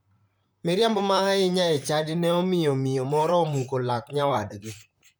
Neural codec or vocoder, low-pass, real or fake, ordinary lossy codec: vocoder, 44.1 kHz, 128 mel bands, Pupu-Vocoder; none; fake; none